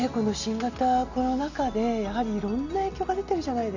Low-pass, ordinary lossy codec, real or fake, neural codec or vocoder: 7.2 kHz; none; real; none